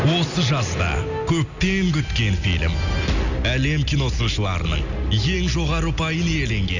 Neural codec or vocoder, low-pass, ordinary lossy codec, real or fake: none; 7.2 kHz; none; real